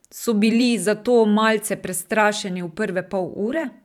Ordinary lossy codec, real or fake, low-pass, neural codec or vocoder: none; fake; 19.8 kHz; vocoder, 44.1 kHz, 128 mel bands every 512 samples, BigVGAN v2